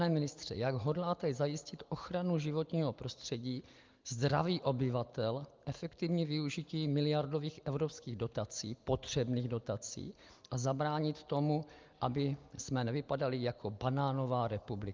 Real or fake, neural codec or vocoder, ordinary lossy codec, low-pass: real; none; Opus, 24 kbps; 7.2 kHz